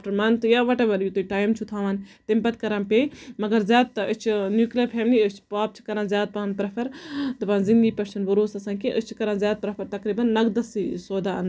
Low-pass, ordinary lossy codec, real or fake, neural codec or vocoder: none; none; real; none